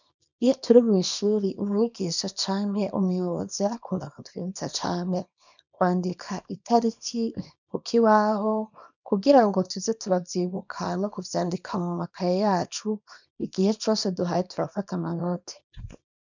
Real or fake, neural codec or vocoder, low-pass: fake; codec, 24 kHz, 0.9 kbps, WavTokenizer, small release; 7.2 kHz